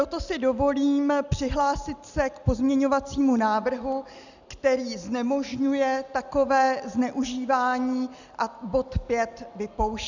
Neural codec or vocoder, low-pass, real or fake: none; 7.2 kHz; real